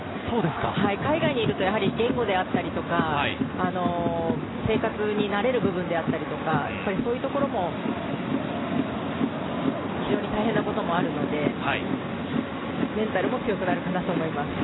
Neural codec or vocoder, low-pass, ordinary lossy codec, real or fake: none; 7.2 kHz; AAC, 16 kbps; real